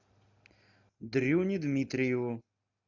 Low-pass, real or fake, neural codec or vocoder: 7.2 kHz; real; none